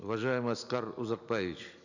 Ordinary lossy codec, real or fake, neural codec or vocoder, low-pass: none; real; none; 7.2 kHz